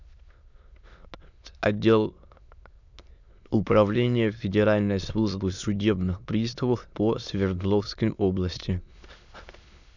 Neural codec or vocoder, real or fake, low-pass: autoencoder, 22.05 kHz, a latent of 192 numbers a frame, VITS, trained on many speakers; fake; 7.2 kHz